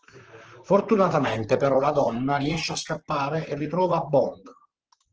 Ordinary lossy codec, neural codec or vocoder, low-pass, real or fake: Opus, 16 kbps; vocoder, 44.1 kHz, 128 mel bands, Pupu-Vocoder; 7.2 kHz; fake